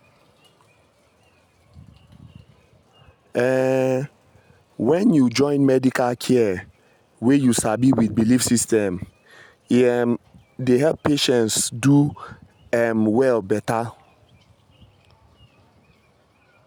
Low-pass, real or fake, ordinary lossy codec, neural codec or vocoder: none; real; none; none